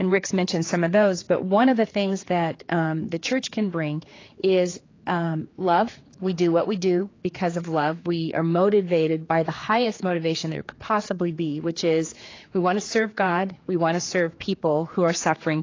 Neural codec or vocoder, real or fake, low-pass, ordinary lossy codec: codec, 16 kHz, 4 kbps, X-Codec, HuBERT features, trained on general audio; fake; 7.2 kHz; AAC, 32 kbps